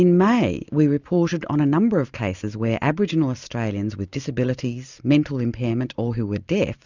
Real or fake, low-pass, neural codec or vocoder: real; 7.2 kHz; none